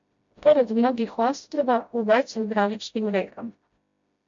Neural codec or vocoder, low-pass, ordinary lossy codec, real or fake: codec, 16 kHz, 0.5 kbps, FreqCodec, smaller model; 7.2 kHz; MP3, 48 kbps; fake